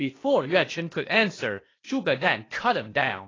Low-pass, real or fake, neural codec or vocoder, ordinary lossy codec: 7.2 kHz; fake; codec, 16 kHz, 0.8 kbps, ZipCodec; AAC, 32 kbps